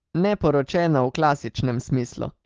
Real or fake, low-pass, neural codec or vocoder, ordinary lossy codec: real; 7.2 kHz; none; Opus, 16 kbps